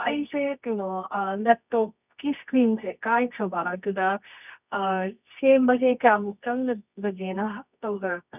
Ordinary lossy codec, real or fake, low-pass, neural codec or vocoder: none; fake; 3.6 kHz; codec, 24 kHz, 0.9 kbps, WavTokenizer, medium music audio release